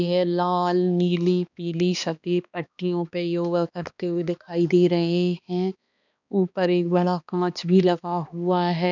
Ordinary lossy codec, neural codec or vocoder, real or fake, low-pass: none; codec, 16 kHz, 2 kbps, X-Codec, HuBERT features, trained on balanced general audio; fake; 7.2 kHz